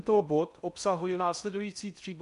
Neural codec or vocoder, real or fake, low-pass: codec, 16 kHz in and 24 kHz out, 0.6 kbps, FocalCodec, streaming, 4096 codes; fake; 10.8 kHz